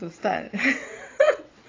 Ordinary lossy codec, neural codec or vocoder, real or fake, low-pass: AAC, 32 kbps; vocoder, 22.05 kHz, 80 mel bands, WaveNeXt; fake; 7.2 kHz